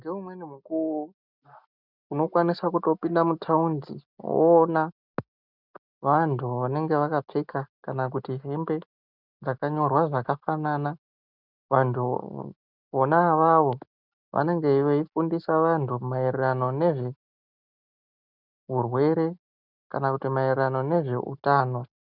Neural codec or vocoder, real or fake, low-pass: none; real; 5.4 kHz